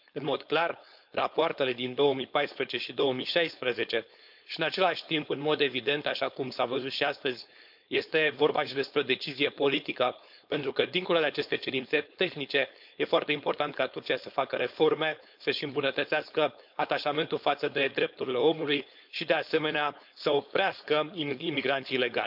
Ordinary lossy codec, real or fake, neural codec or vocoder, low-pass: none; fake; codec, 16 kHz, 4.8 kbps, FACodec; 5.4 kHz